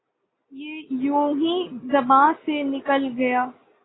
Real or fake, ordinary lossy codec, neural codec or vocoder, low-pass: real; AAC, 16 kbps; none; 7.2 kHz